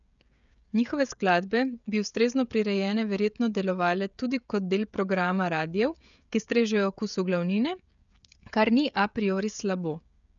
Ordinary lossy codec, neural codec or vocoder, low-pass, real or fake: none; codec, 16 kHz, 16 kbps, FreqCodec, smaller model; 7.2 kHz; fake